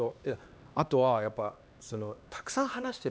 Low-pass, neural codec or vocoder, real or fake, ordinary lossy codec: none; codec, 16 kHz, 2 kbps, X-Codec, HuBERT features, trained on LibriSpeech; fake; none